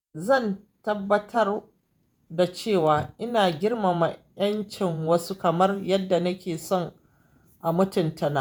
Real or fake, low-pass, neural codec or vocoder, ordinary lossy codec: fake; none; vocoder, 48 kHz, 128 mel bands, Vocos; none